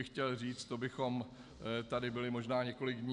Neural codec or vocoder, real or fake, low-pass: none; real; 10.8 kHz